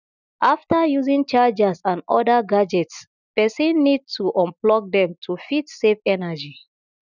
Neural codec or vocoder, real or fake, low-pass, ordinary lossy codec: none; real; 7.2 kHz; none